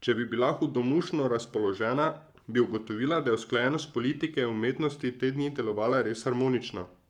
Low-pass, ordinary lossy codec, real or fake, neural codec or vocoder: 19.8 kHz; none; fake; codec, 44.1 kHz, 7.8 kbps, Pupu-Codec